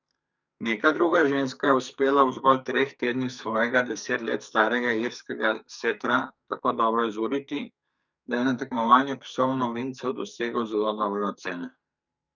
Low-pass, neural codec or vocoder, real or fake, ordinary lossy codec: 7.2 kHz; codec, 44.1 kHz, 2.6 kbps, SNAC; fake; none